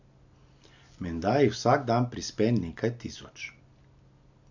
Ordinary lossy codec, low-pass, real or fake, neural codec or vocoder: none; 7.2 kHz; real; none